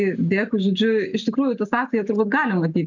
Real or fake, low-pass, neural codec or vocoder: real; 7.2 kHz; none